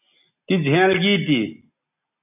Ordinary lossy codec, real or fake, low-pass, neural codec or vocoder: AAC, 24 kbps; real; 3.6 kHz; none